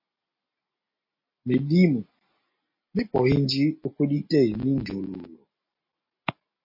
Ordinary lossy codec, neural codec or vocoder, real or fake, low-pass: MP3, 24 kbps; none; real; 5.4 kHz